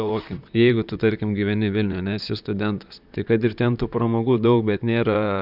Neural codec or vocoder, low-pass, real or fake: vocoder, 44.1 kHz, 128 mel bands, Pupu-Vocoder; 5.4 kHz; fake